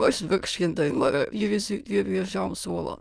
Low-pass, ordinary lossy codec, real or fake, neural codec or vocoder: none; none; fake; autoencoder, 22.05 kHz, a latent of 192 numbers a frame, VITS, trained on many speakers